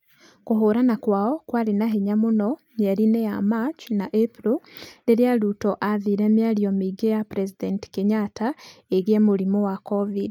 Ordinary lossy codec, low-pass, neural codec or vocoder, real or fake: none; 19.8 kHz; none; real